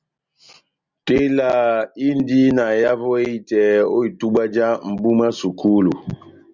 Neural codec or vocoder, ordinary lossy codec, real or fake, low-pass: none; Opus, 64 kbps; real; 7.2 kHz